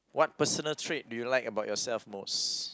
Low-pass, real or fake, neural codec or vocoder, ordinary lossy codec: none; real; none; none